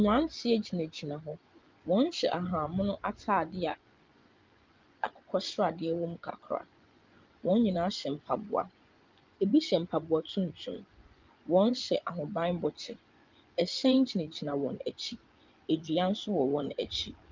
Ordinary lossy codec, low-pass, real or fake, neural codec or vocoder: Opus, 32 kbps; 7.2 kHz; fake; vocoder, 24 kHz, 100 mel bands, Vocos